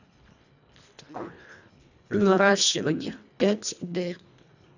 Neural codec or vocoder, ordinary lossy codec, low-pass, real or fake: codec, 24 kHz, 1.5 kbps, HILCodec; none; 7.2 kHz; fake